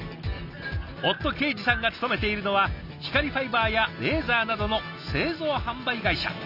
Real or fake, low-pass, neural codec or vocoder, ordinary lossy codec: real; 5.4 kHz; none; none